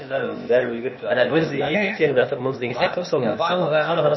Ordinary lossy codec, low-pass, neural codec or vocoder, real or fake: MP3, 24 kbps; 7.2 kHz; codec, 16 kHz, 0.8 kbps, ZipCodec; fake